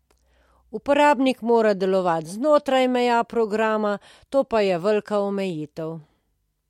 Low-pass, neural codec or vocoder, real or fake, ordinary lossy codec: 19.8 kHz; none; real; MP3, 64 kbps